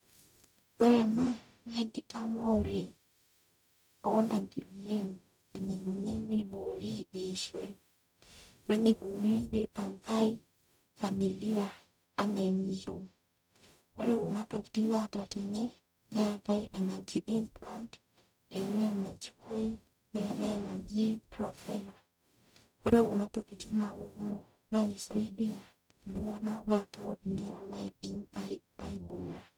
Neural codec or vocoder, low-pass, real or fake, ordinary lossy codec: codec, 44.1 kHz, 0.9 kbps, DAC; 19.8 kHz; fake; none